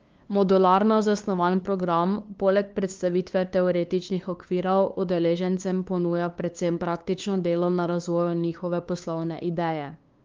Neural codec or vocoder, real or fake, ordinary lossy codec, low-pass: codec, 16 kHz, 2 kbps, FunCodec, trained on LibriTTS, 25 frames a second; fake; Opus, 32 kbps; 7.2 kHz